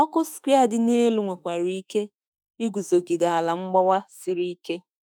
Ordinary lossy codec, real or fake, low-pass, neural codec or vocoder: none; fake; none; autoencoder, 48 kHz, 32 numbers a frame, DAC-VAE, trained on Japanese speech